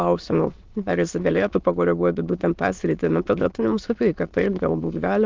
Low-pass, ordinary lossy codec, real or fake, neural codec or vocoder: 7.2 kHz; Opus, 32 kbps; fake; autoencoder, 22.05 kHz, a latent of 192 numbers a frame, VITS, trained on many speakers